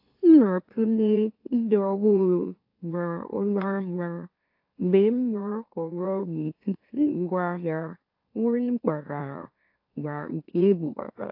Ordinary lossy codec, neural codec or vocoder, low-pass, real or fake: AAC, 32 kbps; autoencoder, 44.1 kHz, a latent of 192 numbers a frame, MeloTTS; 5.4 kHz; fake